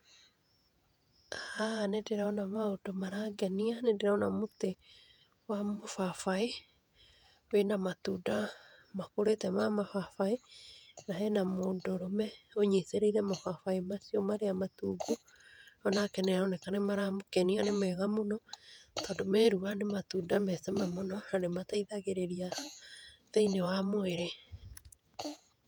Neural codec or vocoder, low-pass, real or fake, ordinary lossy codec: vocoder, 48 kHz, 128 mel bands, Vocos; 19.8 kHz; fake; none